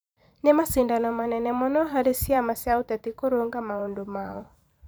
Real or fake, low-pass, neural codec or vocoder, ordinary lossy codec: fake; none; vocoder, 44.1 kHz, 128 mel bands every 512 samples, BigVGAN v2; none